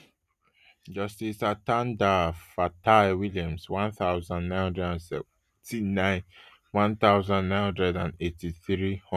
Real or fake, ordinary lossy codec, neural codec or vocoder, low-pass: real; none; none; 14.4 kHz